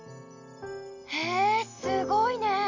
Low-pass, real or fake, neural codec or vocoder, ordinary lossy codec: 7.2 kHz; real; none; none